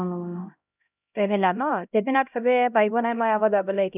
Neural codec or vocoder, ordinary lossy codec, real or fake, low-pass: codec, 16 kHz, 0.5 kbps, X-Codec, HuBERT features, trained on LibriSpeech; none; fake; 3.6 kHz